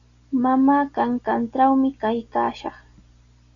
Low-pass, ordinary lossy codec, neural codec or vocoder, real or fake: 7.2 kHz; MP3, 96 kbps; none; real